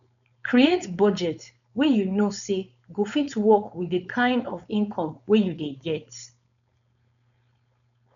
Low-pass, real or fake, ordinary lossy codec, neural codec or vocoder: 7.2 kHz; fake; none; codec, 16 kHz, 4.8 kbps, FACodec